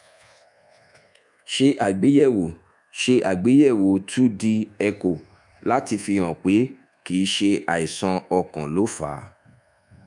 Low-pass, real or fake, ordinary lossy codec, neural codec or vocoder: 10.8 kHz; fake; none; codec, 24 kHz, 1.2 kbps, DualCodec